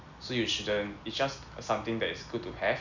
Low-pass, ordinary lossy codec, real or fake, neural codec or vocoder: 7.2 kHz; none; real; none